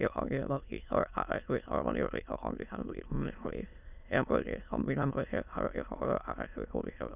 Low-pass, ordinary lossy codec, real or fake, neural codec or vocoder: 3.6 kHz; none; fake; autoencoder, 22.05 kHz, a latent of 192 numbers a frame, VITS, trained on many speakers